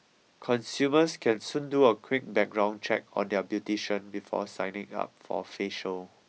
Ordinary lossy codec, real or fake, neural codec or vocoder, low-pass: none; real; none; none